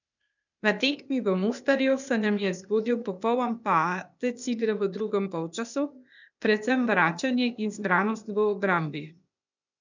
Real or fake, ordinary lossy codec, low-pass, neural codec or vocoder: fake; none; 7.2 kHz; codec, 16 kHz, 0.8 kbps, ZipCodec